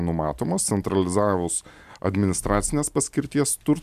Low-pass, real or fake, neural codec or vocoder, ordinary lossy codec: 14.4 kHz; real; none; AAC, 96 kbps